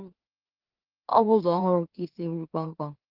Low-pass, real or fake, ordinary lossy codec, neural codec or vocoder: 5.4 kHz; fake; Opus, 16 kbps; autoencoder, 44.1 kHz, a latent of 192 numbers a frame, MeloTTS